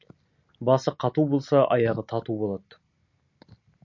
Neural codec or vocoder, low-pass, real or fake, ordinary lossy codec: none; 7.2 kHz; real; MP3, 48 kbps